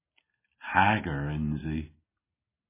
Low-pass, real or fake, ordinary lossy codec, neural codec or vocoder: 3.6 kHz; real; MP3, 16 kbps; none